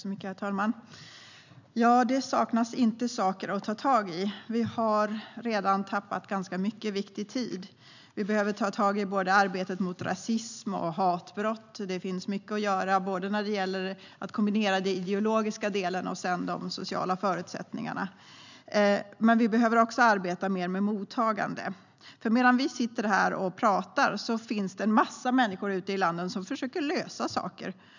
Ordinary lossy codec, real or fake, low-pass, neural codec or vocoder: none; real; 7.2 kHz; none